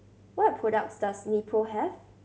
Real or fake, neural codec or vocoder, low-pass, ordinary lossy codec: real; none; none; none